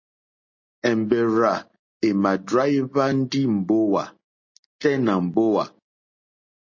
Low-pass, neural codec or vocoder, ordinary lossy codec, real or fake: 7.2 kHz; none; MP3, 32 kbps; real